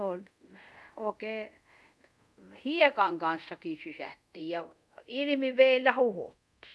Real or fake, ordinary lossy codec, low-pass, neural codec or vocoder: fake; none; none; codec, 24 kHz, 0.5 kbps, DualCodec